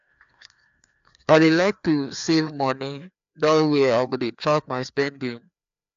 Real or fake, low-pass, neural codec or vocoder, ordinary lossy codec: fake; 7.2 kHz; codec, 16 kHz, 2 kbps, FreqCodec, larger model; AAC, 64 kbps